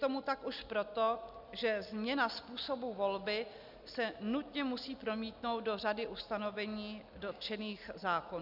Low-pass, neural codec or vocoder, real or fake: 5.4 kHz; none; real